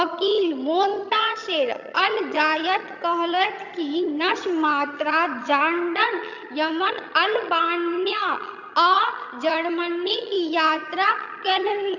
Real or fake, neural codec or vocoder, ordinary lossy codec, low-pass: fake; vocoder, 22.05 kHz, 80 mel bands, HiFi-GAN; none; 7.2 kHz